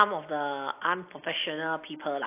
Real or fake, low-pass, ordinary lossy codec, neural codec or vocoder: real; 3.6 kHz; AAC, 32 kbps; none